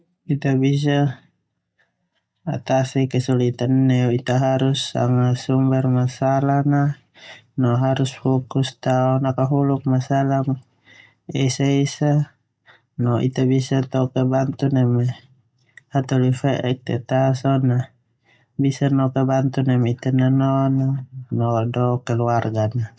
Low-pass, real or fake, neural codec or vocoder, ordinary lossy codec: none; real; none; none